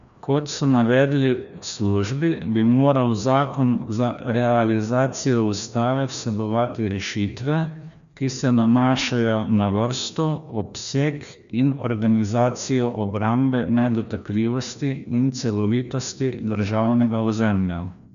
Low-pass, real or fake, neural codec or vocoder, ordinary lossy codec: 7.2 kHz; fake; codec, 16 kHz, 1 kbps, FreqCodec, larger model; none